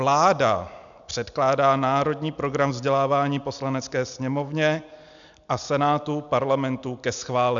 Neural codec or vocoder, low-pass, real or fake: none; 7.2 kHz; real